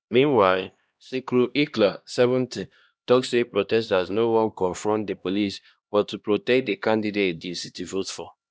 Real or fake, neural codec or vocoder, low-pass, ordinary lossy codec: fake; codec, 16 kHz, 1 kbps, X-Codec, HuBERT features, trained on LibriSpeech; none; none